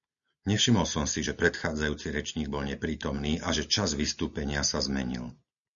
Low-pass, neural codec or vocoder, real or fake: 7.2 kHz; none; real